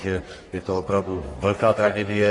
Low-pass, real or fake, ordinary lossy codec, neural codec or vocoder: 10.8 kHz; fake; AAC, 32 kbps; codec, 44.1 kHz, 1.7 kbps, Pupu-Codec